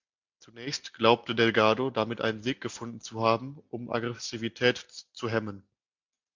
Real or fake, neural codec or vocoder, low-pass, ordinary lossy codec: real; none; 7.2 kHz; MP3, 64 kbps